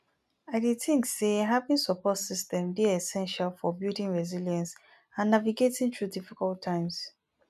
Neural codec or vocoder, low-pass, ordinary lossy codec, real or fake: none; 14.4 kHz; none; real